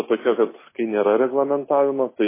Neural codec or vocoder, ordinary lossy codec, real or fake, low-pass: none; MP3, 16 kbps; real; 3.6 kHz